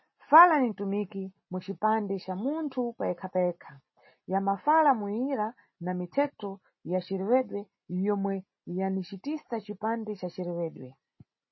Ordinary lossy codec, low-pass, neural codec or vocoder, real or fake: MP3, 24 kbps; 7.2 kHz; none; real